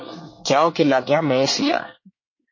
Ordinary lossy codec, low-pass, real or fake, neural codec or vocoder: MP3, 32 kbps; 7.2 kHz; fake; codec, 24 kHz, 1 kbps, SNAC